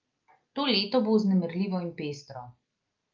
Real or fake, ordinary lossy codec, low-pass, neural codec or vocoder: real; Opus, 24 kbps; 7.2 kHz; none